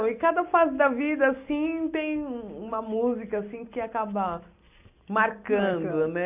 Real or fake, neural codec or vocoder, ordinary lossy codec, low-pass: real; none; AAC, 32 kbps; 3.6 kHz